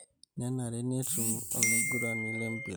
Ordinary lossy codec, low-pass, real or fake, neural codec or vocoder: none; none; real; none